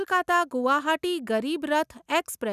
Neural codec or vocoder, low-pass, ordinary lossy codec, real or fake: none; 14.4 kHz; none; real